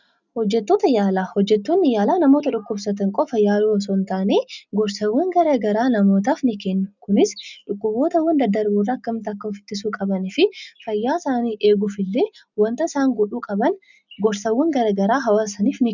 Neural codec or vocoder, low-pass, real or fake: none; 7.2 kHz; real